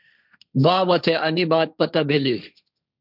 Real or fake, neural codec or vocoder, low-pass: fake; codec, 16 kHz, 1.1 kbps, Voila-Tokenizer; 5.4 kHz